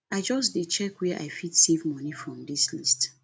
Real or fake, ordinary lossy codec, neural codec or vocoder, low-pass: real; none; none; none